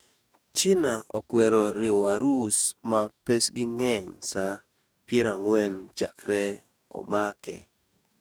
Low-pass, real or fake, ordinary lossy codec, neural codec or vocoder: none; fake; none; codec, 44.1 kHz, 2.6 kbps, DAC